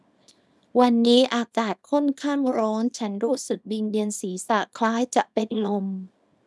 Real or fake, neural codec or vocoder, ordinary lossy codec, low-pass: fake; codec, 24 kHz, 0.9 kbps, WavTokenizer, small release; none; none